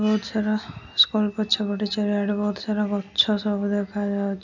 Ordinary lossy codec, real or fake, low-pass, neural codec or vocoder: none; real; 7.2 kHz; none